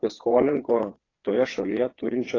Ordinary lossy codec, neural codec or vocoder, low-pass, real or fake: AAC, 32 kbps; vocoder, 22.05 kHz, 80 mel bands, WaveNeXt; 7.2 kHz; fake